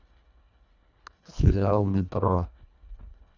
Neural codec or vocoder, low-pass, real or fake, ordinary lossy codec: codec, 24 kHz, 1.5 kbps, HILCodec; 7.2 kHz; fake; none